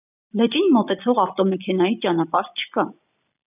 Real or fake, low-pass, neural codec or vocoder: real; 3.6 kHz; none